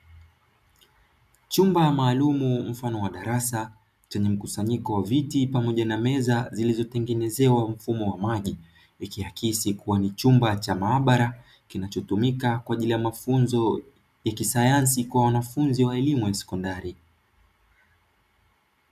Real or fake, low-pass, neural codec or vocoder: real; 14.4 kHz; none